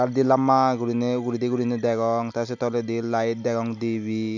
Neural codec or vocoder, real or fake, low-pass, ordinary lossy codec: none; real; 7.2 kHz; none